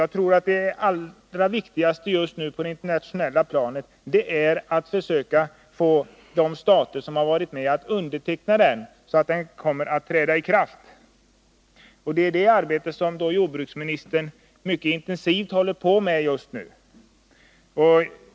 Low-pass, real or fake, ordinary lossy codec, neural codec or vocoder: none; real; none; none